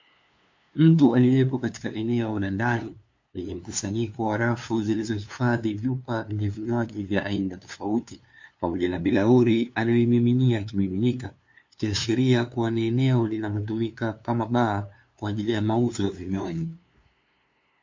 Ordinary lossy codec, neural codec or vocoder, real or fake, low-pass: MP3, 48 kbps; codec, 16 kHz, 2 kbps, FunCodec, trained on LibriTTS, 25 frames a second; fake; 7.2 kHz